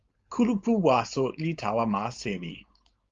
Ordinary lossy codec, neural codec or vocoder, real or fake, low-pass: Opus, 32 kbps; codec, 16 kHz, 4.8 kbps, FACodec; fake; 7.2 kHz